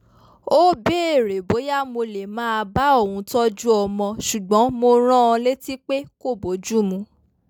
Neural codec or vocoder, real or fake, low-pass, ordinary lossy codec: none; real; 19.8 kHz; none